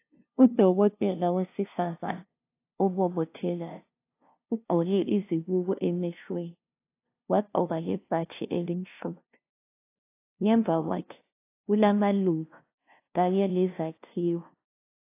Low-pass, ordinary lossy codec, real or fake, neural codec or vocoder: 3.6 kHz; AAC, 24 kbps; fake; codec, 16 kHz, 0.5 kbps, FunCodec, trained on LibriTTS, 25 frames a second